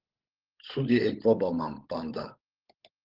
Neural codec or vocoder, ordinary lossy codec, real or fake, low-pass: codec, 16 kHz, 16 kbps, FunCodec, trained on LibriTTS, 50 frames a second; Opus, 16 kbps; fake; 5.4 kHz